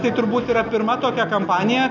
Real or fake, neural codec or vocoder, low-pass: real; none; 7.2 kHz